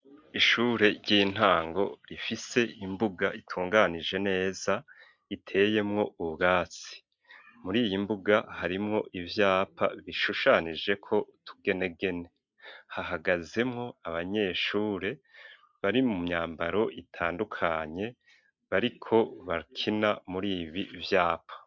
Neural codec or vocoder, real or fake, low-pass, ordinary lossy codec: none; real; 7.2 kHz; MP3, 64 kbps